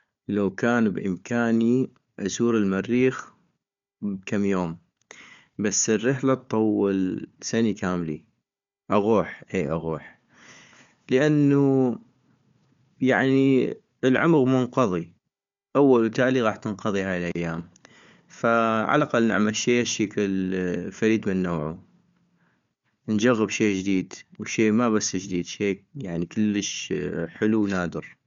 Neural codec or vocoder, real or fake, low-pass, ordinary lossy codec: codec, 16 kHz, 4 kbps, FunCodec, trained on Chinese and English, 50 frames a second; fake; 7.2 kHz; MP3, 64 kbps